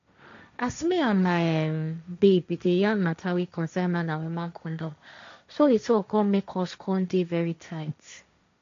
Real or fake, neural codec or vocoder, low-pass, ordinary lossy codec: fake; codec, 16 kHz, 1.1 kbps, Voila-Tokenizer; 7.2 kHz; MP3, 64 kbps